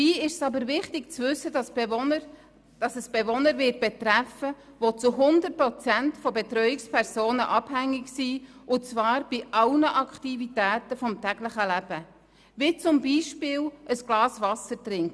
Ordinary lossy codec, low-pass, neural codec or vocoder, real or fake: none; none; none; real